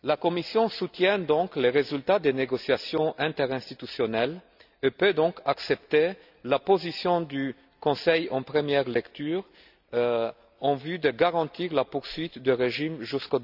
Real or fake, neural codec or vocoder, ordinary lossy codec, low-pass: real; none; none; 5.4 kHz